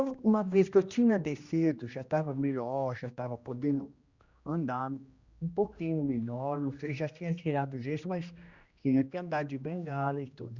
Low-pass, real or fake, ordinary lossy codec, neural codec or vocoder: 7.2 kHz; fake; Opus, 64 kbps; codec, 16 kHz, 1 kbps, X-Codec, HuBERT features, trained on general audio